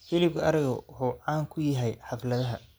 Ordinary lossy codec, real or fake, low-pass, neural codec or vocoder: none; real; none; none